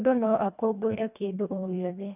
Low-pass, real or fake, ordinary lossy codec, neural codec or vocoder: 3.6 kHz; fake; none; codec, 24 kHz, 1.5 kbps, HILCodec